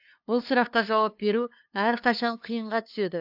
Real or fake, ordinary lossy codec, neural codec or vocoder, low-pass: fake; AAC, 48 kbps; codec, 16 kHz, 2 kbps, FunCodec, trained on LibriTTS, 25 frames a second; 5.4 kHz